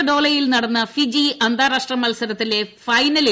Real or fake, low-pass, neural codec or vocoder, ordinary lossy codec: real; none; none; none